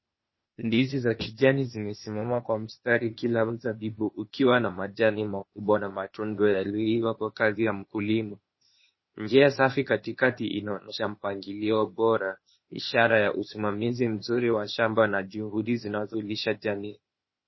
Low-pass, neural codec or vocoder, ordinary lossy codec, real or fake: 7.2 kHz; codec, 16 kHz, 0.8 kbps, ZipCodec; MP3, 24 kbps; fake